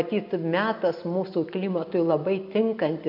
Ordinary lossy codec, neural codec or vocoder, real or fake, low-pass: AAC, 48 kbps; none; real; 5.4 kHz